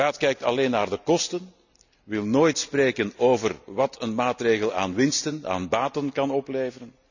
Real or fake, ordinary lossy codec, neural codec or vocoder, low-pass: real; none; none; 7.2 kHz